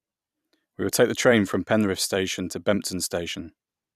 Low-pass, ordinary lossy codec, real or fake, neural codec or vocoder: 14.4 kHz; none; fake; vocoder, 44.1 kHz, 128 mel bands every 256 samples, BigVGAN v2